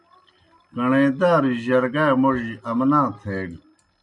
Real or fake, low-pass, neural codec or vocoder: real; 10.8 kHz; none